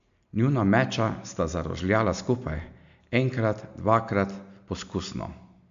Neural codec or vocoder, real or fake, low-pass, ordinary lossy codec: none; real; 7.2 kHz; MP3, 64 kbps